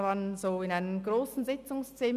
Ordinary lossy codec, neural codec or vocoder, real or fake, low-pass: none; none; real; 14.4 kHz